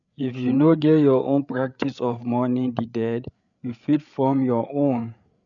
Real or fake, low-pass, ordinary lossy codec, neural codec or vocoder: fake; 7.2 kHz; none; codec, 16 kHz, 8 kbps, FreqCodec, larger model